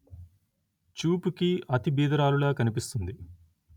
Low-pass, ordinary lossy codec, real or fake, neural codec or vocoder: 19.8 kHz; none; real; none